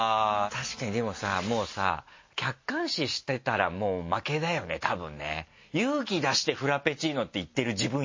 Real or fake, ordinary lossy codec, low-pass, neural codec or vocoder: real; MP3, 32 kbps; 7.2 kHz; none